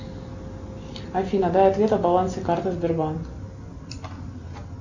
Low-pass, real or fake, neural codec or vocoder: 7.2 kHz; real; none